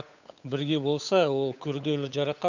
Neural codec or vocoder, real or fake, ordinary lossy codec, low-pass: codec, 16 kHz, 8 kbps, FunCodec, trained on Chinese and English, 25 frames a second; fake; none; 7.2 kHz